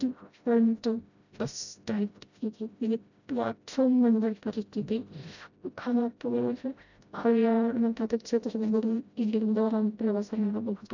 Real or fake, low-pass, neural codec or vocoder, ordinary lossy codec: fake; 7.2 kHz; codec, 16 kHz, 0.5 kbps, FreqCodec, smaller model; none